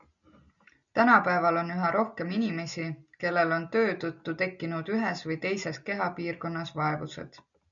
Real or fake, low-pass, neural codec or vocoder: real; 7.2 kHz; none